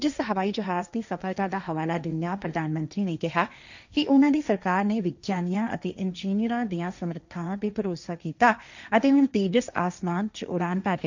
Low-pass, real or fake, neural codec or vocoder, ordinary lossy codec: 7.2 kHz; fake; codec, 16 kHz, 1.1 kbps, Voila-Tokenizer; none